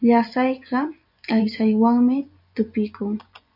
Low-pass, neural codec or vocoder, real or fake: 5.4 kHz; vocoder, 24 kHz, 100 mel bands, Vocos; fake